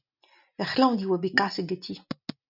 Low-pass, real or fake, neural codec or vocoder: 5.4 kHz; real; none